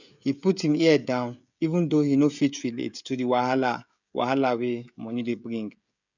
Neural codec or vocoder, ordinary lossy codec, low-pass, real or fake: codec, 16 kHz, 16 kbps, FreqCodec, smaller model; none; 7.2 kHz; fake